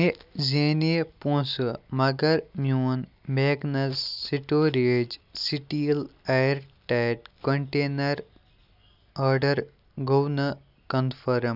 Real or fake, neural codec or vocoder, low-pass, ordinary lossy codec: real; none; 5.4 kHz; none